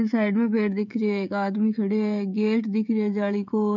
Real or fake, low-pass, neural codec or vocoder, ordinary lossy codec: fake; 7.2 kHz; codec, 16 kHz, 16 kbps, FreqCodec, smaller model; none